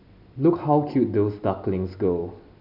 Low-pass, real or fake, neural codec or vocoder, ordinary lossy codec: 5.4 kHz; real; none; none